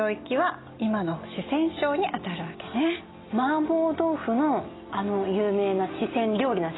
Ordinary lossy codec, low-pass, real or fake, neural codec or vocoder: AAC, 16 kbps; 7.2 kHz; real; none